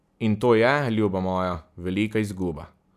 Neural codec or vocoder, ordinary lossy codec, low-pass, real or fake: none; none; 14.4 kHz; real